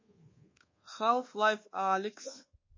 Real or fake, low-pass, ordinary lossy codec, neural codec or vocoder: fake; 7.2 kHz; MP3, 32 kbps; codec, 24 kHz, 1.2 kbps, DualCodec